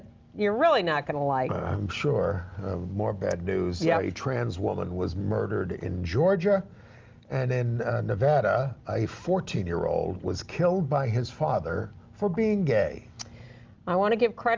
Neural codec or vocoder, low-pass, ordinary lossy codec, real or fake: none; 7.2 kHz; Opus, 24 kbps; real